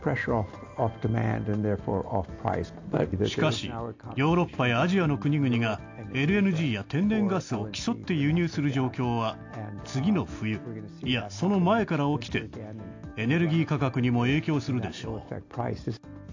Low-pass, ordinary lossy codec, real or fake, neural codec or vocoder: 7.2 kHz; AAC, 48 kbps; real; none